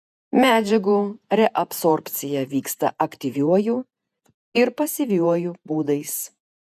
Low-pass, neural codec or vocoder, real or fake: 14.4 kHz; vocoder, 48 kHz, 128 mel bands, Vocos; fake